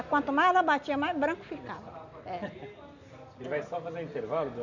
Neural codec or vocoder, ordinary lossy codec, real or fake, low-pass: none; none; real; 7.2 kHz